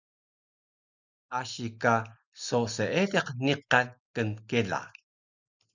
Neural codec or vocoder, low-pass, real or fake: none; 7.2 kHz; real